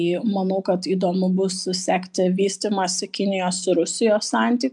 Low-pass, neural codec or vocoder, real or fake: 10.8 kHz; none; real